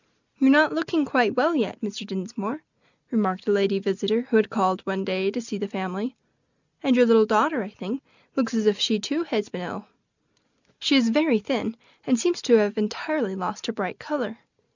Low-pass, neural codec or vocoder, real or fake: 7.2 kHz; none; real